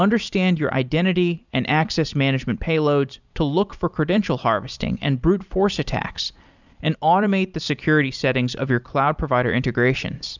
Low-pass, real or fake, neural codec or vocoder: 7.2 kHz; fake; vocoder, 44.1 kHz, 128 mel bands every 256 samples, BigVGAN v2